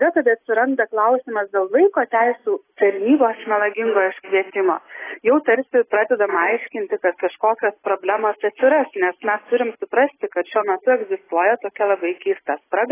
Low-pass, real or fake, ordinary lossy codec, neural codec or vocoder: 3.6 kHz; real; AAC, 16 kbps; none